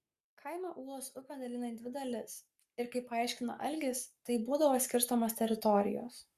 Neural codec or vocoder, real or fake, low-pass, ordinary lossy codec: codec, 44.1 kHz, 7.8 kbps, Pupu-Codec; fake; 14.4 kHz; Opus, 64 kbps